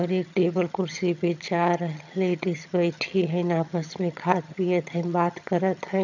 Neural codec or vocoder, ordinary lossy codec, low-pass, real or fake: vocoder, 22.05 kHz, 80 mel bands, HiFi-GAN; none; 7.2 kHz; fake